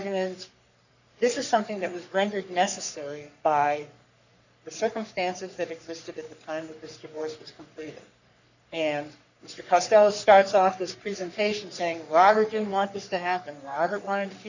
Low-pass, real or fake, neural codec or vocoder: 7.2 kHz; fake; codec, 44.1 kHz, 3.4 kbps, Pupu-Codec